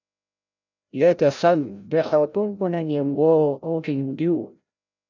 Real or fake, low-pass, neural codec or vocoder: fake; 7.2 kHz; codec, 16 kHz, 0.5 kbps, FreqCodec, larger model